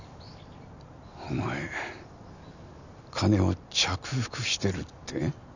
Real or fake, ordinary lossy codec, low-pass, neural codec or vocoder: real; none; 7.2 kHz; none